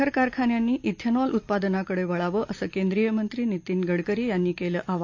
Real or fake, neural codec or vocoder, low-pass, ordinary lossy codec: real; none; 7.2 kHz; AAC, 48 kbps